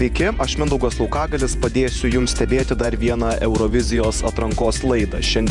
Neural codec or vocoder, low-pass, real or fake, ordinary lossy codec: vocoder, 48 kHz, 128 mel bands, Vocos; 10.8 kHz; fake; MP3, 96 kbps